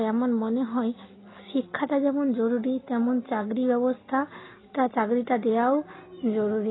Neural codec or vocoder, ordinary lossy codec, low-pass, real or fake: none; AAC, 16 kbps; 7.2 kHz; real